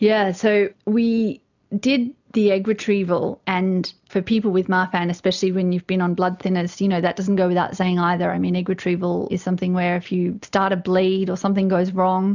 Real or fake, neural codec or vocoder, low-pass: real; none; 7.2 kHz